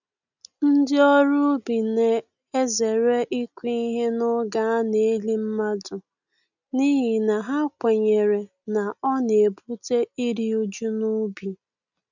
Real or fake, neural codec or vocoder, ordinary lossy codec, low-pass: real; none; none; 7.2 kHz